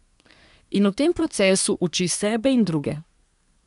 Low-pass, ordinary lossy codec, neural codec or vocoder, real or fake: 10.8 kHz; none; codec, 24 kHz, 1 kbps, SNAC; fake